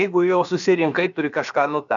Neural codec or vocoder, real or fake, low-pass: codec, 16 kHz, about 1 kbps, DyCAST, with the encoder's durations; fake; 7.2 kHz